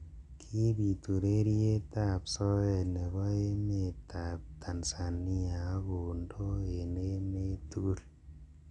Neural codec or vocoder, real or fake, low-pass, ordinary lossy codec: none; real; 10.8 kHz; none